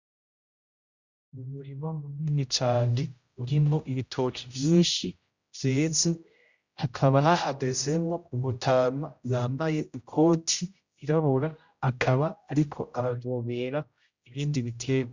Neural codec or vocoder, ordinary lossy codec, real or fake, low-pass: codec, 16 kHz, 0.5 kbps, X-Codec, HuBERT features, trained on general audio; Opus, 64 kbps; fake; 7.2 kHz